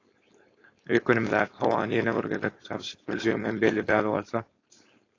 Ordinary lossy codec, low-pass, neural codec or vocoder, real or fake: AAC, 32 kbps; 7.2 kHz; codec, 16 kHz, 4.8 kbps, FACodec; fake